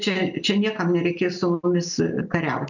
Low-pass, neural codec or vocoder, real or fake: 7.2 kHz; none; real